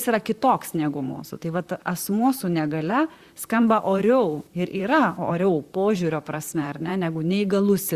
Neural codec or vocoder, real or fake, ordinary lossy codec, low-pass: vocoder, 44.1 kHz, 128 mel bands, Pupu-Vocoder; fake; Opus, 64 kbps; 14.4 kHz